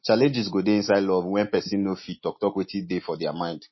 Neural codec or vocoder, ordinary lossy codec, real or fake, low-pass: none; MP3, 24 kbps; real; 7.2 kHz